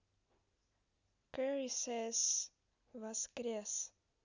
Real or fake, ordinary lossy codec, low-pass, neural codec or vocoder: real; none; 7.2 kHz; none